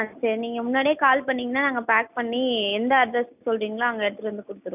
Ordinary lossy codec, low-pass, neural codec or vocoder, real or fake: none; 3.6 kHz; none; real